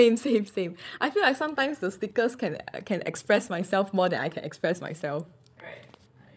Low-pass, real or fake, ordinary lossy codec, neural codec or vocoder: none; fake; none; codec, 16 kHz, 16 kbps, FreqCodec, larger model